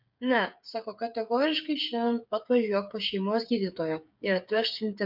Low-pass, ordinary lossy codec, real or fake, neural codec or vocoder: 5.4 kHz; MP3, 48 kbps; fake; codec, 16 kHz, 8 kbps, FreqCodec, smaller model